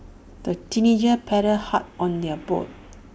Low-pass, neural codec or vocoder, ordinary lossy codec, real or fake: none; none; none; real